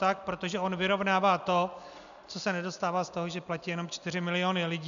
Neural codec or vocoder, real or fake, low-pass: none; real; 7.2 kHz